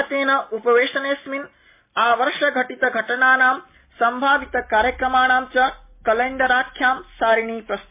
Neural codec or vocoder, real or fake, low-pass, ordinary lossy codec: none; real; 3.6 kHz; MP3, 24 kbps